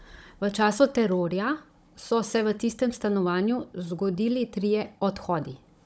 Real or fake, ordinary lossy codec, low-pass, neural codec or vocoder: fake; none; none; codec, 16 kHz, 16 kbps, FunCodec, trained on Chinese and English, 50 frames a second